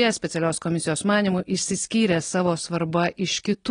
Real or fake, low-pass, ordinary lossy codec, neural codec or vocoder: real; 9.9 kHz; AAC, 32 kbps; none